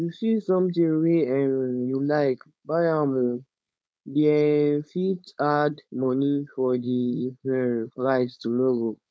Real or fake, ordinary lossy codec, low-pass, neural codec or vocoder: fake; none; none; codec, 16 kHz, 4.8 kbps, FACodec